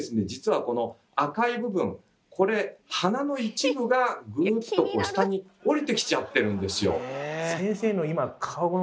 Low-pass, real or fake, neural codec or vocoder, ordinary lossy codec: none; real; none; none